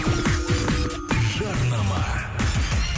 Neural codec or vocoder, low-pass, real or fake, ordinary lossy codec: none; none; real; none